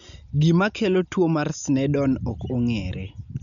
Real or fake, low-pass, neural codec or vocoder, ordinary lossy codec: real; 7.2 kHz; none; none